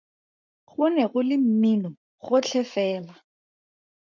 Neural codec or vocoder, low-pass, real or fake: codec, 44.1 kHz, 7.8 kbps, Pupu-Codec; 7.2 kHz; fake